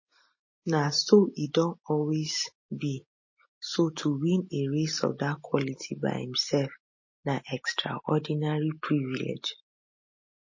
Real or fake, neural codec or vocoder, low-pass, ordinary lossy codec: real; none; 7.2 kHz; MP3, 32 kbps